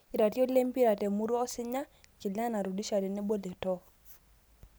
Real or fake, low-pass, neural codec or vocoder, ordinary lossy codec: real; none; none; none